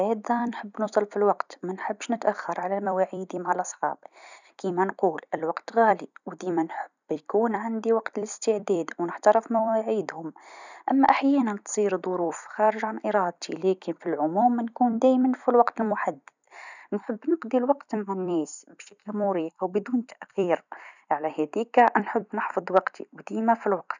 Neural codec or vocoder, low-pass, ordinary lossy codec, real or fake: vocoder, 44.1 kHz, 128 mel bands every 256 samples, BigVGAN v2; 7.2 kHz; none; fake